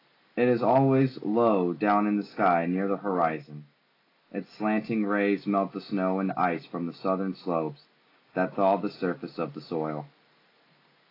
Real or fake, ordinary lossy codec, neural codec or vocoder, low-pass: real; AAC, 24 kbps; none; 5.4 kHz